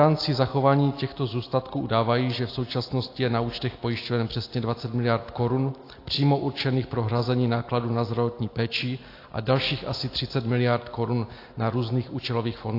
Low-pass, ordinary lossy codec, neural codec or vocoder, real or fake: 5.4 kHz; AAC, 32 kbps; none; real